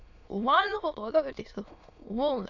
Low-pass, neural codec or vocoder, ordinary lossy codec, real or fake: 7.2 kHz; autoencoder, 22.05 kHz, a latent of 192 numbers a frame, VITS, trained on many speakers; none; fake